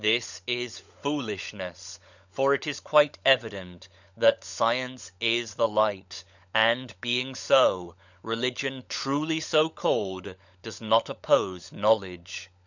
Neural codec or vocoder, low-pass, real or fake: codec, 16 kHz, 16 kbps, FunCodec, trained on LibriTTS, 50 frames a second; 7.2 kHz; fake